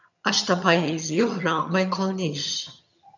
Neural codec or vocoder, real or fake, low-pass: vocoder, 22.05 kHz, 80 mel bands, HiFi-GAN; fake; 7.2 kHz